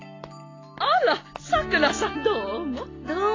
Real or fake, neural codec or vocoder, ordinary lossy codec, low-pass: real; none; AAC, 32 kbps; 7.2 kHz